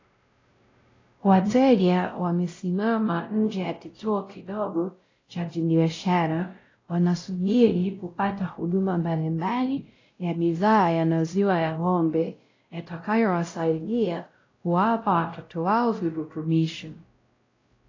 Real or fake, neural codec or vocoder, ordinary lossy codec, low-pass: fake; codec, 16 kHz, 0.5 kbps, X-Codec, WavLM features, trained on Multilingual LibriSpeech; AAC, 32 kbps; 7.2 kHz